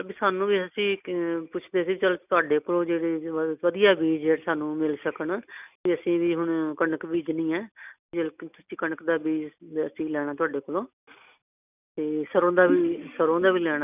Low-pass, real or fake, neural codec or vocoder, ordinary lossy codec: 3.6 kHz; real; none; none